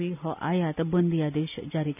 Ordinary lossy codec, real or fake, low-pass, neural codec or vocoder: none; real; 3.6 kHz; none